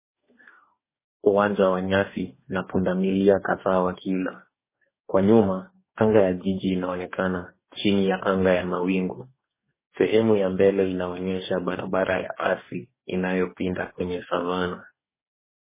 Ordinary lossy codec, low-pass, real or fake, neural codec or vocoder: MP3, 16 kbps; 3.6 kHz; fake; codec, 44.1 kHz, 2.6 kbps, DAC